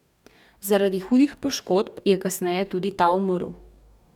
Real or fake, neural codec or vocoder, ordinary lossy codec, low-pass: fake; codec, 44.1 kHz, 2.6 kbps, DAC; none; 19.8 kHz